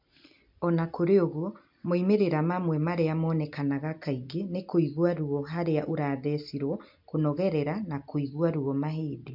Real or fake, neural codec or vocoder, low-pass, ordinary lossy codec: real; none; 5.4 kHz; MP3, 48 kbps